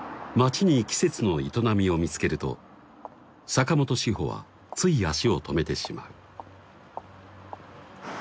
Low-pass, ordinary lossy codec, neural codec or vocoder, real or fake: none; none; none; real